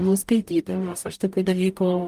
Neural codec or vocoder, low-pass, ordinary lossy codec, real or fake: codec, 44.1 kHz, 0.9 kbps, DAC; 14.4 kHz; Opus, 32 kbps; fake